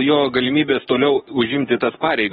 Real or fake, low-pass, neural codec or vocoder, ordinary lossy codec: fake; 19.8 kHz; codec, 44.1 kHz, 7.8 kbps, DAC; AAC, 16 kbps